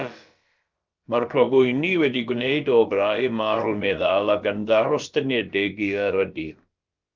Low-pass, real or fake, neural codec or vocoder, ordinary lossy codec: 7.2 kHz; fake; codec, 16 kHz, about 1 kbps, DyCAST, with the encoder's durations; Opus, 24 kbps